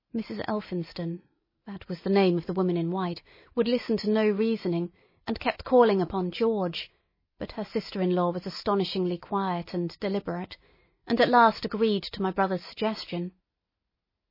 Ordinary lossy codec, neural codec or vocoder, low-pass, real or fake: MP3, 24 kbps; none; 5.4 kHz; real